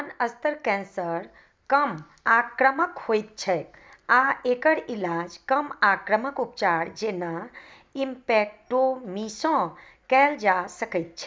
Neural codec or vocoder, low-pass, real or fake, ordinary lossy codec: none; none; real; none